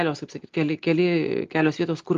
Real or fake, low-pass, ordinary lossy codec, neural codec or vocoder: real; 7.2 kHz; Opus, 32 kbps; none